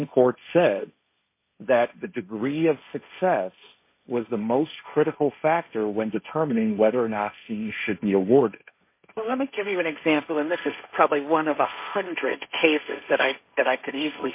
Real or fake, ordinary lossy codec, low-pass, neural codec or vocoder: fake; MP3, 24 kbps; 3.6 kHz; codec, 16 kHz, 1.1 kbps, Voila-Tokenizer